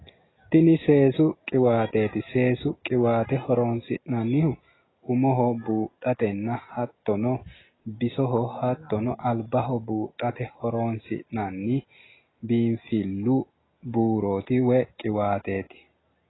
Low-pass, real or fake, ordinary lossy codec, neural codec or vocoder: 7.2 kHz; real; AAC, 16 kbps; none